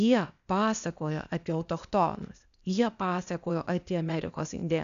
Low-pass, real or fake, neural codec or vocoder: 7.2 kHz; fake; codec, 16 kHz, 0.8 kbps, ZipCodec